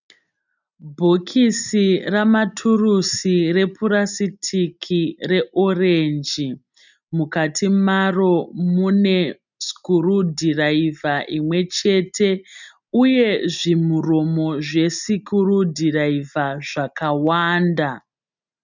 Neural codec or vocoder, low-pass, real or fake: none; 7.2 kHz; real